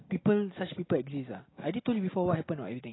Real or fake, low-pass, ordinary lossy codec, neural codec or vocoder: real; 7.2 kHz; AAC, 16 kbps; none